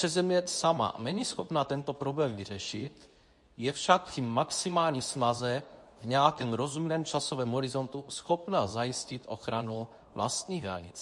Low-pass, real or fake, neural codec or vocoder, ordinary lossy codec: 10.8 kHz; fake; codec, 24 kHz, 0.9 kbps, WavTokenizer, medium speech release version 2; MP3, 48 kbps